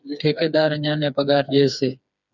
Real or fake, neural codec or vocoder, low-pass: fake; codec, 16 kHz, 4 kbps, FreqCodec, smaller model; 7.2 kHz